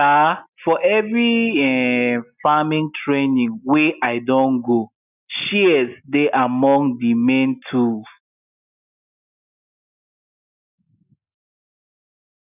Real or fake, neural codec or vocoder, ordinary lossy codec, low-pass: real; none; none; 3.6 kHz